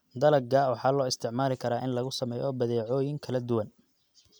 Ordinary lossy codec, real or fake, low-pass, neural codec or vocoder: none; real; none; none